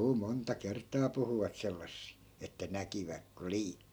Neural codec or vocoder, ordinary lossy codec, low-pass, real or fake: none; none; none; real